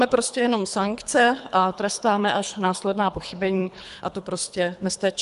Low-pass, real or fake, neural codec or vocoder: 10.8 kHz; fake; codec, 24 kHz, 3 kbps, HILCodec